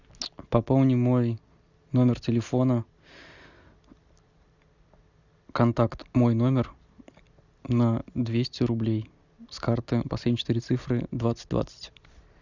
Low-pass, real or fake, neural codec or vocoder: 7.2 kHz; real; none